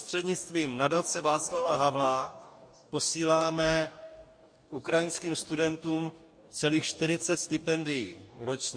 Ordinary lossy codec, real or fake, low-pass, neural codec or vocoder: MP3, 48 kbps; fake; 9.9 kHz; codec, 44.1 kHz, 2.6 kbps, DAC